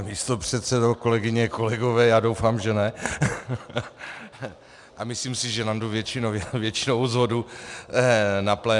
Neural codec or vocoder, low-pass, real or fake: none; 10.8 kHz; real